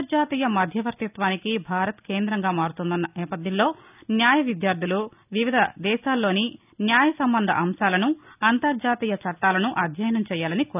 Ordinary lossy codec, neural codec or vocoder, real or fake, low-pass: none; none; real; 3.6 kHz